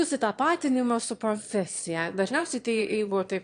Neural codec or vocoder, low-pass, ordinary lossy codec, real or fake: autoencoder, 22.05 kHz, a latent of 192 numbers a frame, VITS, trained on one speaker; 9.9 kHz; AAC, 64 kbps; fake